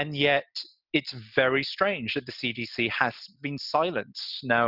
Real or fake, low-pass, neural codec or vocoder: real; 5.4 kHz; none